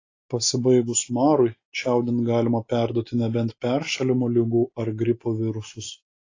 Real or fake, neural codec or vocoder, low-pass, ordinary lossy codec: real; none; 7.2 kHz; AAC, 32 kbps